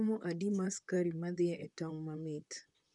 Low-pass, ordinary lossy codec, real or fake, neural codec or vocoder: 10.8 kHz; none; fake; vocoder, 44.1 kHz, 128 mel bands, Pupu-Vocoder